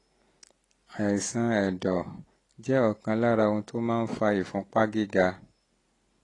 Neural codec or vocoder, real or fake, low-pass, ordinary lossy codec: none; real; 10.8 kHz; AAC, 32 kbps